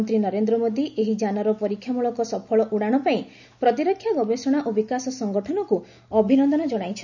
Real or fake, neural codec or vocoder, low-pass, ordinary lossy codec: real; none; 7.2 kHz; none